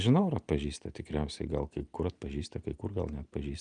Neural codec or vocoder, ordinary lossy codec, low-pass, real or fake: none; Opus, 32 kbps; 9.9 kHz; real